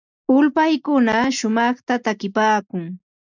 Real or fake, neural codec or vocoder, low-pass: real; none; 7.2 kHz